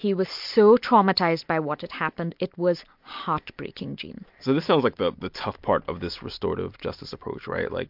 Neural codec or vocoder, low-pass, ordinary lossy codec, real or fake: none; 5.4 kHz; MP3, 48 kbps; real